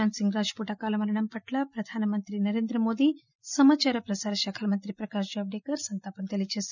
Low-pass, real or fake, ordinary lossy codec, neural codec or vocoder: 7.2 kHz; real; none; none